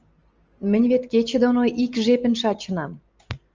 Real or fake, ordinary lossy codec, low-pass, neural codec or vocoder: real; Opus, 24 kbps; 7.2 kHz; none